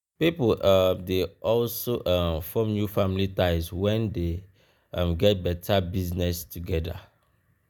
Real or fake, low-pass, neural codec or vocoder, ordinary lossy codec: real; none; none; none